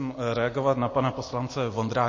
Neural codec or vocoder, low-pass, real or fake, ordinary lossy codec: none; 7.2 kHz; real; MP3, 32 kbps